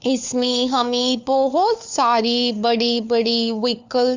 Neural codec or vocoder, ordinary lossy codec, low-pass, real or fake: codec, 16 kHz, 4 kbps, FunCodec, trained on LibriTTS, 50 frames a second; Opus, 64 kbps; 7.2 kHz; fake